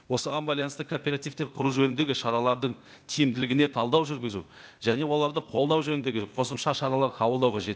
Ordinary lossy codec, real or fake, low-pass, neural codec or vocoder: none; fake; none; codec, 16 kHz, 0.8 kbps, ZipCodec